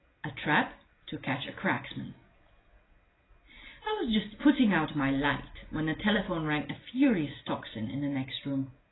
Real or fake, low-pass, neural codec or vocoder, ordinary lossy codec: real; 7.2 kHz; none; AAC, 16 kbps